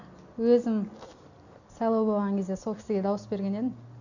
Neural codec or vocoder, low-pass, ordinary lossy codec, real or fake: none; 7.2 kHz; none; real